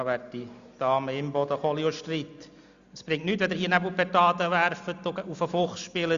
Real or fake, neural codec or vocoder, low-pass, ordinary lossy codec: real; none; 7.2 kHz; Opus, 64 kbps